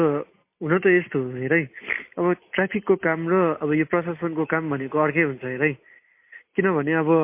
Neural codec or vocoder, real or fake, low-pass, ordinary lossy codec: none; real; 3.6 kHz; MP3, 24 kbps